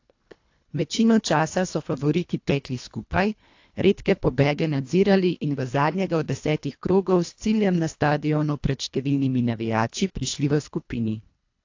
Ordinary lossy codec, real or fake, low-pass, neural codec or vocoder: AAC, 48 kbps; fake; 7.2 kHz; codec, 24 kHz, 1.5 kbps, HILCodec